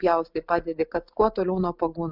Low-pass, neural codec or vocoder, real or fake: 5.4 kHz; none; real